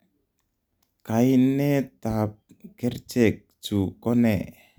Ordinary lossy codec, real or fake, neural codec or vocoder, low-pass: none; real; none; none